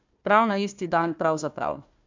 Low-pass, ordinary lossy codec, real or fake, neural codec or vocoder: 7.2 kHz; MP3, 64 kbps; fake; codec, 16 kHz, 1 kbps, FunCodec, trained on Chinese and English, 50 frames a second